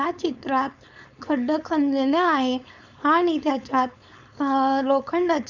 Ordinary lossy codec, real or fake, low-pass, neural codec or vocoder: none; fake; 7.2 kHz; codec, 16 kHz, 4.8 kbps, FACodec